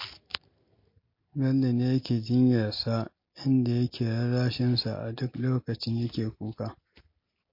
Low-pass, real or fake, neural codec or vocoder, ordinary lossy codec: 5.4 kHz; real; none; MP3, 32 kbps